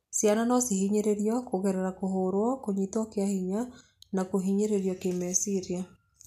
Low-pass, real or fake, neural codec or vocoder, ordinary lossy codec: 14.4 kHz; real; none; MP3, 96 kbps